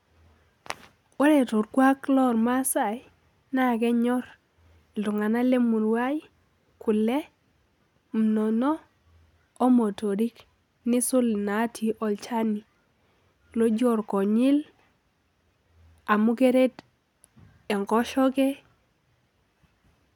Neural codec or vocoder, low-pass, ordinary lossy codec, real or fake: none; 19.8 kHz; none; real